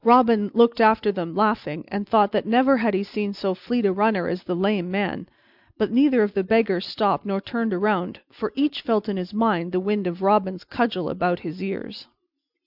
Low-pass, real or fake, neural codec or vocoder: 5.4 kHz; real; none